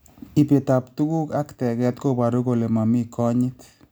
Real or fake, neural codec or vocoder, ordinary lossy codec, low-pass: real; none; none; none